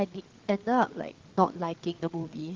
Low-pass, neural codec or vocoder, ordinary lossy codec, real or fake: 7.2 kHz; codec, 16 kHz, 8 kbps, FreqCodec, larger model; Opus, 16 kbps; fake